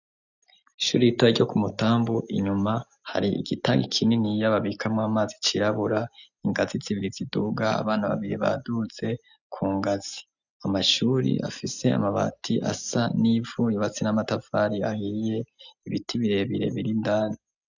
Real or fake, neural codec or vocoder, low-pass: real; none; 7.2 kHz